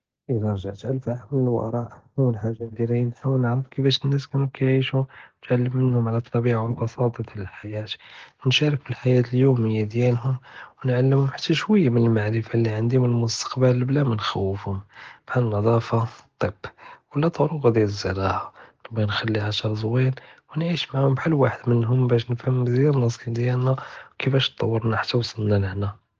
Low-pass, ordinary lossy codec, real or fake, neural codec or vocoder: 7.2 kHz; Opus, 16 kbps; real; none